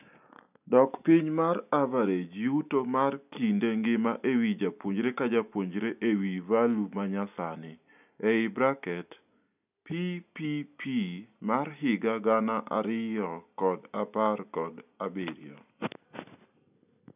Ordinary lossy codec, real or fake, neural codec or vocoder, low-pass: none; real; none; 3.6 kHz